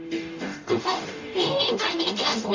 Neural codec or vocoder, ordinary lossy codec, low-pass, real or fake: codec, 44.1 kHz, 0.9 kbps, DAC; AAC, 48 kbps; 7.2 kHz; fake